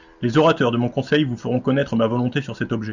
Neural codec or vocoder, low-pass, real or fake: none; 7.2 kHz; real